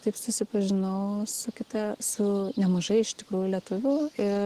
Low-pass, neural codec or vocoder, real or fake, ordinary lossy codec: 14.4 kHz; none; real; Opus, 16 kbps